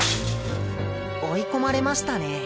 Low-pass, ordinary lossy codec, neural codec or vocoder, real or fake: none; none; none; real